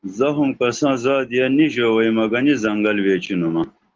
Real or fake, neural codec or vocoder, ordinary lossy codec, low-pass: real; none; Opus, 16 kbps; 7.2 kHz